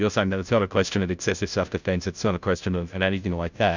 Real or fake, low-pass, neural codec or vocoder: fake; 7.2 kHz; codec, 16 kHz, 0.5 kbps, FunCodec, trained on Chinese and English, 25 frames a second